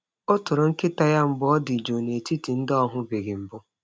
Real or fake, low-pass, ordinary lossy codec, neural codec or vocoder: real; none; none; none